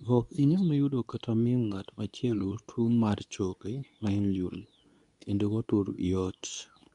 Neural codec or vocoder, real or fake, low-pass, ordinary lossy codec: codec, 24 kHz, 0.9 kbps, WavTokenizer, medium speech release version 2; fake; 10.8 kHz; none